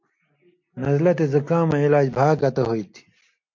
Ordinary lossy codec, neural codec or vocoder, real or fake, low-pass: MP3, 48 kbps; none; real; 7.2 kHz